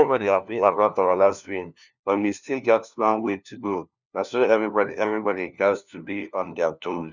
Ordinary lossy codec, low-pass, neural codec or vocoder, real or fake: none; 7.2 kHz; codec, 16 kHz, 1 kbps, FunCodec, trained on LibriTTS, 50 frames a second; fake